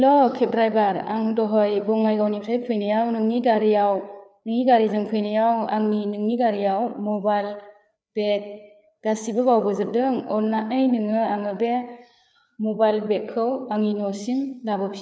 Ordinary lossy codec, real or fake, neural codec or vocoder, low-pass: none; fake; codec, 16 kHz, 4 kbps, FreqCodec, larger model; none